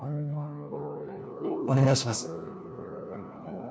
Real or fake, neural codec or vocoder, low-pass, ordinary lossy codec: fake; codec, 16 kHz, 1 kbps, FunCodec, trained on LibriTTS, 50 frames a second; none; none